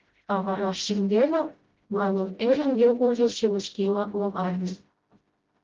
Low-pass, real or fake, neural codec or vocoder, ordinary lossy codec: 7.2 kHz; fake; codec, 16 kHz, 0.5 kbps, FreqCodec, smaller model; Opus, 32 kbps